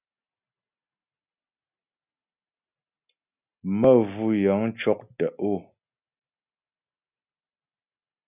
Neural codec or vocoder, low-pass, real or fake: none; 3.6 kHz; real